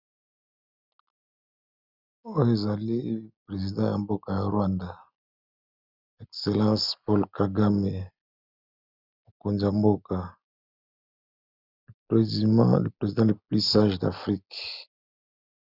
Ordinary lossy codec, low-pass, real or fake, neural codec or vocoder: Opus, 64 kbps; 5.4 kHz; real; none